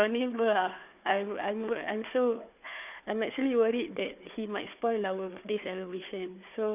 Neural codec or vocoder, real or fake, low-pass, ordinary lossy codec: codec, 16 kHz, 4 kbps, FunCodec, trained on Chinese and English, 50 frames a second; fake; 3.6 kHz; none